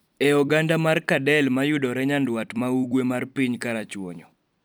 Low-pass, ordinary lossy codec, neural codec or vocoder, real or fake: none; none; vocoder, 44.1 kHz, 128 mel bands every 256 samples, BigVGAN v2; fake